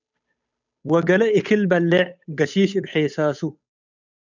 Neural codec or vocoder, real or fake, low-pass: codec, 16 kHz, 8 kbps, FunCodec, trained on Chinese and English, 25 frames a second; fake; 7.2 kHz